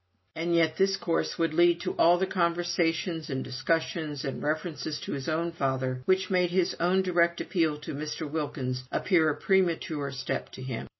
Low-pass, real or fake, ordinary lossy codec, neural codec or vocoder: 7.2 kHz; real; MP3, 24 kbps; none